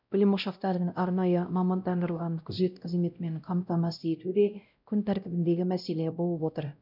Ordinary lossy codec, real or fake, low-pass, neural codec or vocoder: none; fake; 5.4 kHz; codec, 16 kHz, 0.5 kbps, X-Codec, WavLM features, trained on Multilingual LibriSpeech